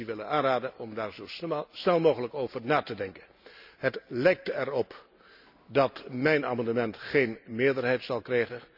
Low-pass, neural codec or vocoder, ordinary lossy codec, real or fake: 5.4 kHz; none; none; real